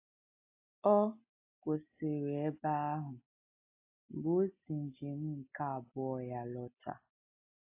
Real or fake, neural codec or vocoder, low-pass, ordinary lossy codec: real; none; 3.6 kHz; AAC, 32 kbps